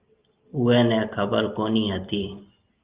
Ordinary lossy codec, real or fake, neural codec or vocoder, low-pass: Opus, 32 kbps; real; none; 3.6 kHz